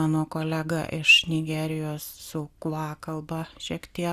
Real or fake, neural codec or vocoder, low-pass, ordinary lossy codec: real; none; 14.4 kHz; Opus, 64 kbps